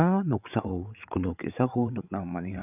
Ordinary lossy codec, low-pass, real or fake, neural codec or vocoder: none; 3.6 kHz; fake; codec, 16 kHz, 8 kbps, FreqCodec, larger model